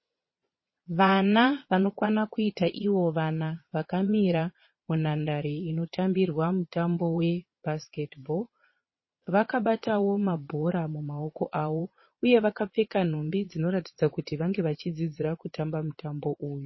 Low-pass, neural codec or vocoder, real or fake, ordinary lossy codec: 7.2 kHz; vocoder, 22.05 kHz, 80 mel bands, Vocos; fake; MP3, 24 kbps